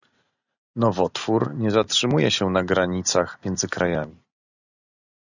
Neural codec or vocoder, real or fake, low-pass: none; real; 7.2 kHz